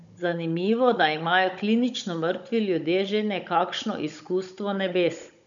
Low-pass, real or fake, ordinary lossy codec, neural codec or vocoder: 7.2 kHz; fake; none; codec, 16 kHz, 16 kbps, FunCodec, trained on Chinese and English, 50 frames a second